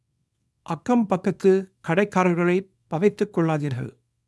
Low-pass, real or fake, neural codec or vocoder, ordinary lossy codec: none; fake; codec, 24 kHz, 0.9 kbps, WavTokenizer, small release; none